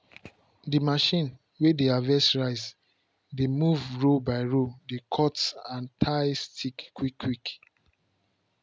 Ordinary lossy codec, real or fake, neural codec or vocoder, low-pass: none; real; none; none